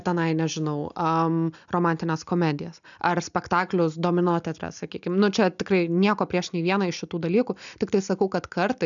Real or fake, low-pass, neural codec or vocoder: real; 7.2 kHz; none